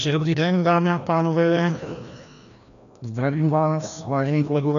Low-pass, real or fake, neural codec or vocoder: 7.2 kHz; fake; codec, 16 kHz, 1 kbps, FreqCodec, larger model